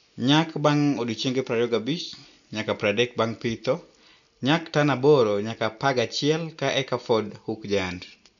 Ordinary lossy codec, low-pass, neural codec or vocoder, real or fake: none; 7.2 kHz; none; real